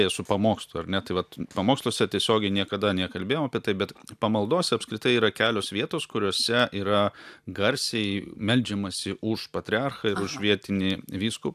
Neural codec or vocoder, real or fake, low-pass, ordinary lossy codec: none; real; 14.4 kHz; AAC, 96 kbps